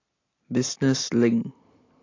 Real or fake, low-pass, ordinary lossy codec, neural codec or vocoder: real; 7.2 kHz; AAC, 32 kbps; none